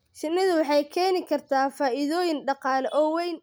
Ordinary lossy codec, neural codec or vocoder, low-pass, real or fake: none; none; none; real